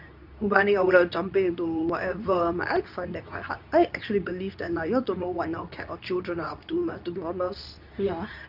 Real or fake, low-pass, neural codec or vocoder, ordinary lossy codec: fake; 5.4 kHz; codec, 24 kHz, 0.9 kbps, WavTokenizer, medium speech release version 2; none